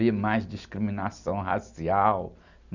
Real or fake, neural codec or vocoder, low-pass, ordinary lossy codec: real; none; 7.2 kHz; none